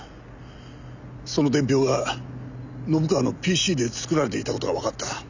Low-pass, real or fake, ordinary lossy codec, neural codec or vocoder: 7.2 kHz; real; none; none